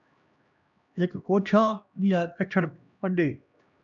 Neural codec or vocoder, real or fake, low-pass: codec, 16 kHz, 1 kbps, X-Codec, HuBERT features, trained on LibriSpeech; fake; 7.2 kHz